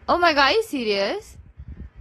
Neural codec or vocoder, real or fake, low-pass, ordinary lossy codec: none; real; 19.8 kHz; AAC, 32 kbps